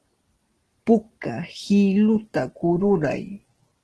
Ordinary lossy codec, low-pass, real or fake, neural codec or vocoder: Opus, 16 kbps; 10.8 kHz; real; none